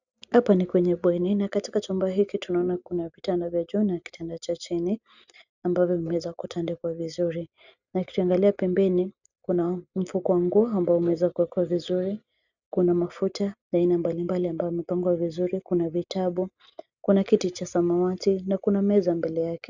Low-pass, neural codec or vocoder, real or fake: 7.2 kHz; none; real